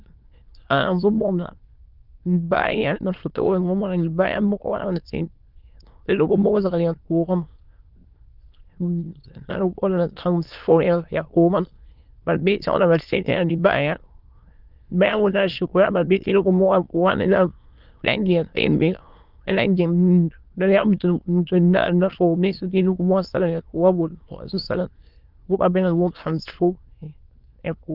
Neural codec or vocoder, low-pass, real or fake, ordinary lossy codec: autoencoder, 22.05 kHz, a latent of 192 numbers a frame, VITS, trained on many speakers; 5.4 kHz; fake; Opus, 32 kbps